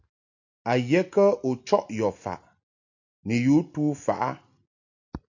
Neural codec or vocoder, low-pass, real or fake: none; 7.2 kHz; real